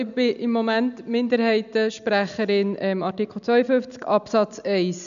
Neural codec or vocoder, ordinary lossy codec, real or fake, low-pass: none; none; real; 7.2 kHz